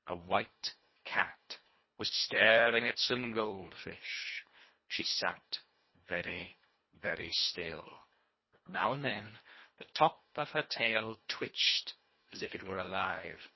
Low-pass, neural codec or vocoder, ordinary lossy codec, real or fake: 7.2 kHz; codec, 24 kHz, 1.5 kbps, HILCodec; MP3, 24 kbps; fake